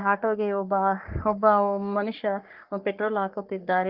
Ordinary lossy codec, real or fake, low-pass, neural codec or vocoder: Opus, 32 kbps; fake; 5.4 kHz; codec, 44.1 kHz, 3.4 kbps, Pupu-Codec